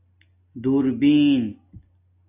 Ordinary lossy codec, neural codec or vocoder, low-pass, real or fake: AAC, 24 kbps; none; 3.6 kHz; real